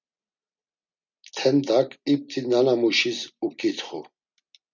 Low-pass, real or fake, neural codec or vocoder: 7.2 kHz; real; none